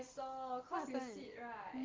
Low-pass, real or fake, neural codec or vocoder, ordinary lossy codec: 7.2 kHz; real; none; Opus, 16 kbps